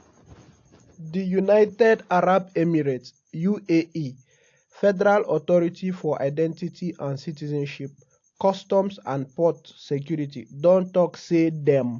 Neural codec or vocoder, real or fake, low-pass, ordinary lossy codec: none; real; 7.2 kHz; AAC, 48 kbps